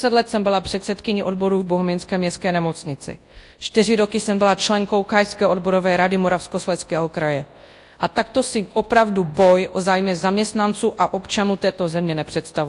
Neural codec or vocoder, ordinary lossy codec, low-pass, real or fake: codec, 24 kHz, 0.9 kbps, WavTokenizer, large speech release; AAC, 48 kbps; 10.8 kHz; fake